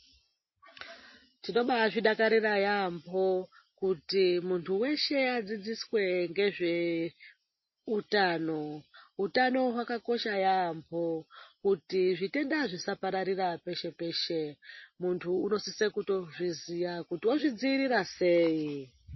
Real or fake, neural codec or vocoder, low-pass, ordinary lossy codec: real; none; 7.2 kHz; MP3, 24 kbps